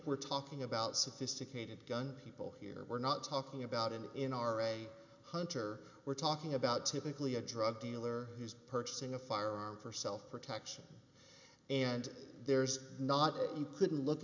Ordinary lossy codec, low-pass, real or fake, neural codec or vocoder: MP3, 64 kbps; 7.2 kHz; real; none